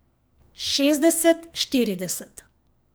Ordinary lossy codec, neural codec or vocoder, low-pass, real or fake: none; codec, 44.1 kHz, 2.6 kbps, SNAC; none; fake